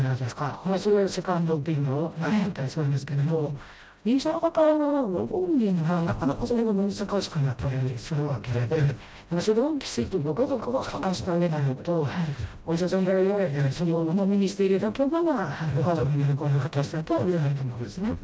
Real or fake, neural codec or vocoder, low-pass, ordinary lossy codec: fake; codec, 16 kHz, 0.5 kbps, FreqCodec, smaller model; none; none